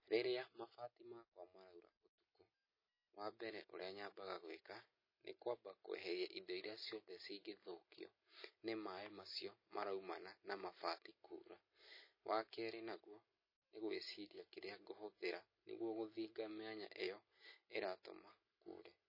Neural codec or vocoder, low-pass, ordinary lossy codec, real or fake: none; 5.4 kHz; MP3, 24 kbps; real